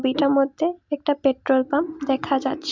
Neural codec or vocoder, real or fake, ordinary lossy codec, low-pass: none; real; MP3, 64 kbps; 7.2 kHz